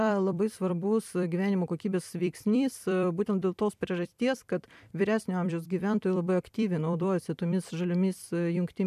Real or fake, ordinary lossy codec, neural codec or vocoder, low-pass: fake; MP3, 96 kbps; vocoder, 44.1 kHz, 128 mel bands every 256 samples, BigVGAN v2; 14.4 kHz